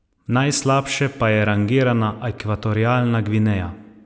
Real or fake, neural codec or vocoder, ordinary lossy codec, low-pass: real; none; none; none